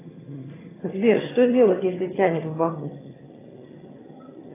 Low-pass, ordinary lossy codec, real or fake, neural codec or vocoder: 3.6 kHz; AAC, 16 kbps; fake; vocoder, 22.05 kHz, 80 mel bands, HiFi-GAN